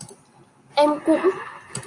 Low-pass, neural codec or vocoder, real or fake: 10.8 kHz; none; real